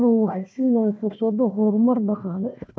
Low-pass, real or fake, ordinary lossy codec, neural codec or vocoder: none; fake; none; codec, 16 kHz, 1 kbps, FunCodec, trained on Chinese and English, 50 frames a second